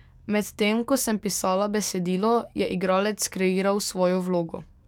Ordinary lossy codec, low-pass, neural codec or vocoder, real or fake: none; 19.8 kHz; codec, 44.1 kHz, 7.8 kbps, DAC; fake